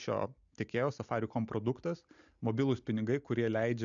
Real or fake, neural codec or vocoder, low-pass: real; none; 7.2 kHz